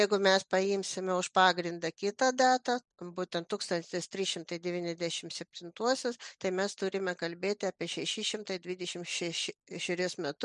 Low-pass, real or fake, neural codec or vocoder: 10.8 kHz; real; none